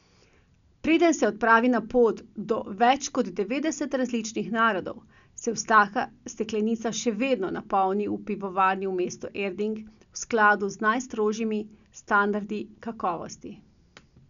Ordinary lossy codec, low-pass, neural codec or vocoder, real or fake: none; 7.2 kHz; none; real